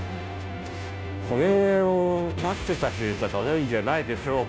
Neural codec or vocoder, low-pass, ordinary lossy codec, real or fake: codec, 16 kHz, 0.5 kbps, FunCodec, trained on Chinese and English, 25 frames a second; none; none; fake